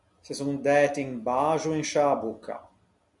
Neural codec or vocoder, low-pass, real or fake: none; 10.8 kHz; real